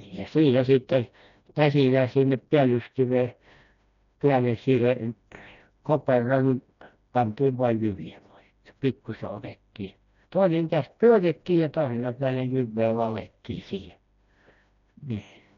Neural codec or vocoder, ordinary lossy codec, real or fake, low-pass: codec, 16 kHz, 1 kbps, FreqCodec, smaller model; none; fake; 7.2 kHz